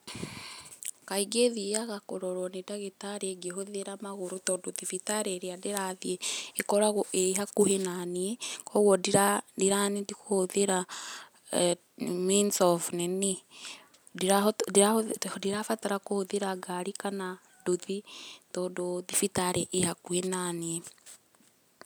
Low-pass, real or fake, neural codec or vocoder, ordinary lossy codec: none; real; none; none